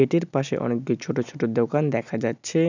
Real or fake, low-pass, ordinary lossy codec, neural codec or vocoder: real; 7.2 kHz; none; none